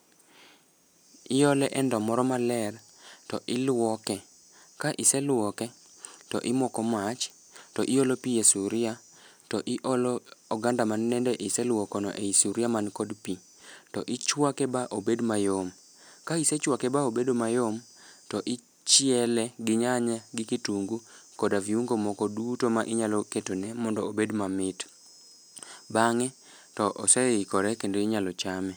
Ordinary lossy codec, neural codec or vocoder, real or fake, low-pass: none; none; real; none